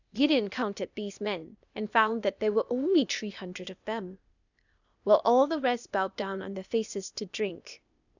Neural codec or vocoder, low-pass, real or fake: codec, 16 kHz, 0.8 kbps, ZipCodec; 7.2 kHz; fake